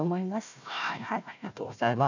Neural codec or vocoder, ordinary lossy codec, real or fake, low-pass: codec, 16 kHz, 1 kbps, FunCodec, trained on Chinese and English, 50 frames a second; none; fake; 7.2 kHz